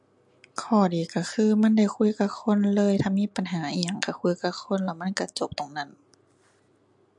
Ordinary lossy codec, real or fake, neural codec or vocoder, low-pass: MP3, 64 kbps; real; none; 10.8 kHz